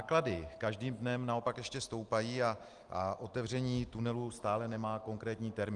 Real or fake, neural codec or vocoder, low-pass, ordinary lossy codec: real; none; 10.8 kHz; Opus, 24 kbps